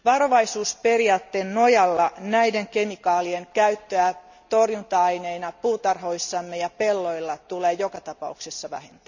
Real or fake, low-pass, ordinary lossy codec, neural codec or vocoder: real; 7.2 kHz; none; none